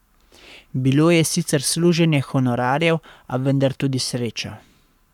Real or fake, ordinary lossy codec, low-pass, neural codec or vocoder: fake; none; 19.8 kHz; codec, 44.1 kHz, 7.8 kbps, Pupu-Codec